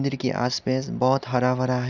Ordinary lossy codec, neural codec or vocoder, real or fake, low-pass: none; none; real; 7.2 kHz